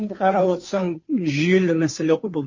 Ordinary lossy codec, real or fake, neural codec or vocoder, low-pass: MP3, 32 kbps; fake; codec, 16 kHz, 2 kbps, FunCodec, trained on Chinese and English, 25 frames a second; 7.2 kHz